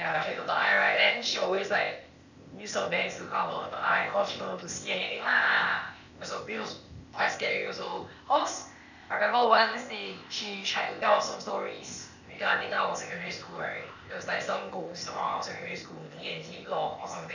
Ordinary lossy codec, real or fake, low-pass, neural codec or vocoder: none; fake; 7.2 kHz; codec, 16 kHz, 0.8 kbps, ZipCodec